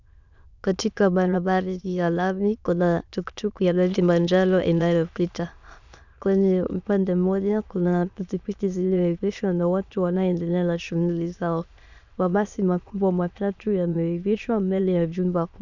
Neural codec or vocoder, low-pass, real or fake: autoencoder, 22.05 kHz, a latent of 192 numbers a frame, VITS, trained on many speakers; 7.2 kHz; fake